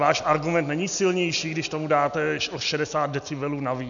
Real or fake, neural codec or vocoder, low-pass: real; none; 7.2 kHz